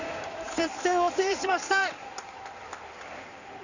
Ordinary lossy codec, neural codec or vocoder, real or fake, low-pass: none; codec, 16 kHz in and 24 kHz out, 2.2 kbps, FireRedTTS-2 codec; fake; 7.2 kHz